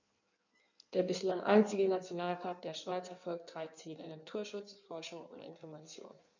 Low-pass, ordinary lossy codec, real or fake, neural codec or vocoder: 7.2 kHz; none; fake; codec, 16 kHz in and 24 kHz out, 1.1 kbps, FireRedTTS-2 codec